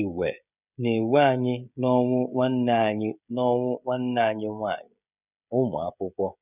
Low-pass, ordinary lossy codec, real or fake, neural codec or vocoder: 3.6 kHz; none; fake; codec, 16 kHz, 16 kbps, FreqCodec, smaller model